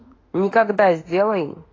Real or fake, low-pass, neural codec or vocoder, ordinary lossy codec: fake; 7.2 kHz; autoencoder, 48 kHz, 32 numbers a frame, DAC-VAE, trained on Japanese speech; AAC, 32 kbps